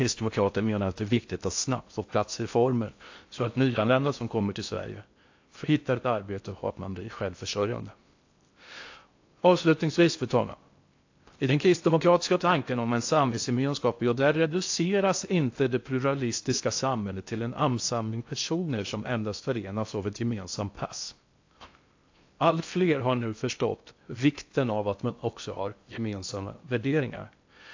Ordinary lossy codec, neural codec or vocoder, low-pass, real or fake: AAC, 48 kbps; codec, 16 kHz in and 24 kHz out, 0.6 kbps, FocalCodec, streaming, 4096 codes; 7.2 kHz; fake